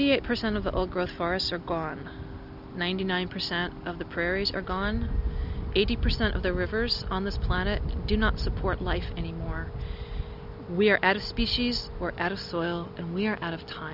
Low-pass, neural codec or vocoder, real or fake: 5.4 kHz; none; real